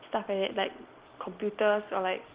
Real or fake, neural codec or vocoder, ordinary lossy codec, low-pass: real; none; Opus, 16 kbps; 3.6 kHz